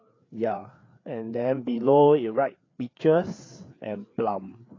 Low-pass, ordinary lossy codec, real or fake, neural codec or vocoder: 7.2 kHz; AAC, 32 kbps; fake; codec, 16 kHz, 4 kbps, FreqCodec, larger model